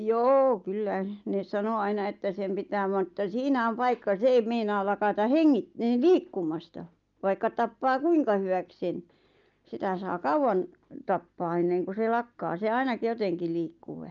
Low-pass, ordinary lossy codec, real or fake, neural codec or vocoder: 7.2 kHz; Opus, 24 kbps; real; none